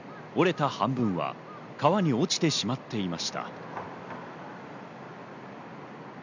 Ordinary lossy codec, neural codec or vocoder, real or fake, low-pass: none; none; real; 7.2 kHz